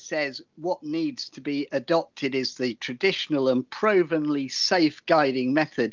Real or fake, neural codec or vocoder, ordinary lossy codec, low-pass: real; none; Opus, 32 kbps; 7.2 kHz